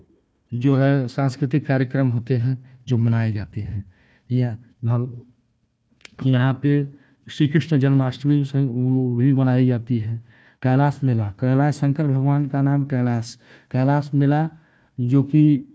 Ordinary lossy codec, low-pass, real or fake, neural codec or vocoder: none; none; fake; codec, 16 kHz, 1 kbps, FunCodec, trained on Chinese and English, 50 frames a second